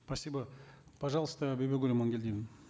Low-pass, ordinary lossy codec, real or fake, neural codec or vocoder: none; none; real; none